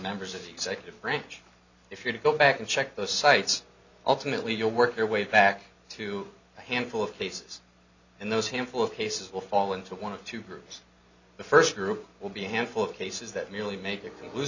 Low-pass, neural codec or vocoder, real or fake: 7.2 kHz; none; real